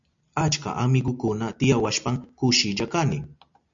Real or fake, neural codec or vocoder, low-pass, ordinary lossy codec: real; none; 7.2 kHz; MP3, 64 kbps